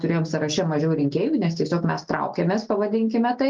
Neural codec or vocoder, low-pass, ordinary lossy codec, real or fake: none; 7.2 kHz; Opus, 24 kbps; real